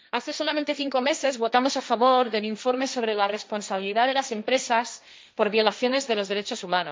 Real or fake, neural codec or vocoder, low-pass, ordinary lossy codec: fake; codec, 16 kHz, 1.1 kbps, Voila-Tokenizer; none; none